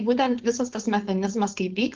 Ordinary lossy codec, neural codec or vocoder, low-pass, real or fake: Opus, 16 kbps; codec, 16 kHz, 4.8 kbps, FACodec; 7.2 kHz; fake